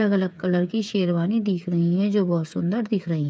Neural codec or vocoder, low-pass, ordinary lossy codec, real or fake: codec, 16 kHz, 8 kbps, FreqCodec, smaller model; none; none; fake